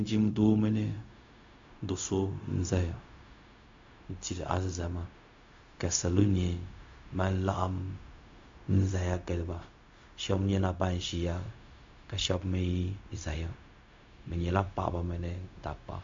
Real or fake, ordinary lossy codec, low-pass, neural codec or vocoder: fake; MP3, 48 kbps; 7.2 kHz; codec, 16 kHz, 0.4 kbps, LongCat-Audio-Codec